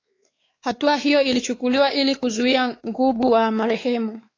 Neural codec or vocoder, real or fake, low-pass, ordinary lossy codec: codec, 16 kHz, 4 kbps, X-Codec, WavLM features, trained on Multilingual LibriSpeech; fake; 7.2 kHz; AAC, 32 kbps